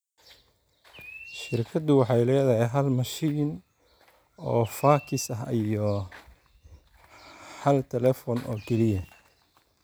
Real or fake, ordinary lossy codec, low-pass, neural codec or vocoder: real; none; none; none